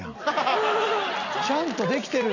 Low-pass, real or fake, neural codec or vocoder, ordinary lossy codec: 7.2 kHz; real; none; none